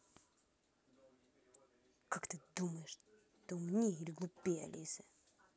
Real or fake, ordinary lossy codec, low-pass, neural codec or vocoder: real; none; none; none